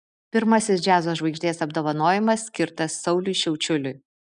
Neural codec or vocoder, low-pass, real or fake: none; 9.9 kHz; real